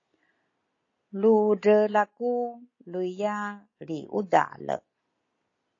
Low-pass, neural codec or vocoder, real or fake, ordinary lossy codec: 7.2 kHz; none; real; AAC, 32 kbps